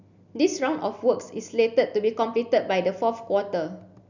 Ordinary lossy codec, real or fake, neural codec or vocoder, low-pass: none; real; none; 7.2 kHz